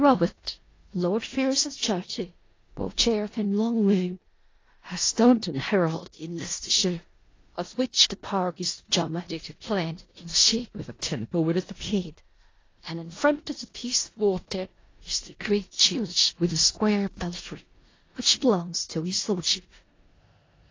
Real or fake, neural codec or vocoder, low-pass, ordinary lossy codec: fake; codec, 16 kHz in and 24 kHz out, 0.4 kbps, LongCat-Audio-Codec, four codebook decoder; 7.2 kHz; AAC, 32 kbps